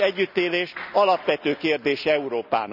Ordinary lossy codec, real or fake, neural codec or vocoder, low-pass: none; real; none; 5.4 kHz